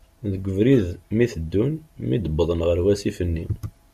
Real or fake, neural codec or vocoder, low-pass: real; none; 14.4 kHz